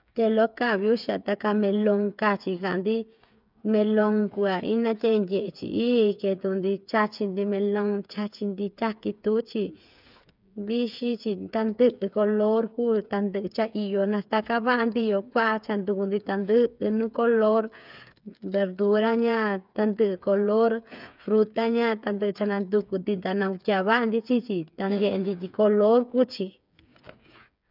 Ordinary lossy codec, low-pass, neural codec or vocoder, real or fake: none; 5.4 kHz; codec, 16 kHz, 8 kbps, FreqCodec, smaller model; fake